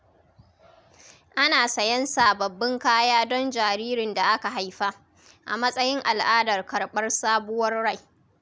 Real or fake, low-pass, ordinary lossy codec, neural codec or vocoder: real; none; none; none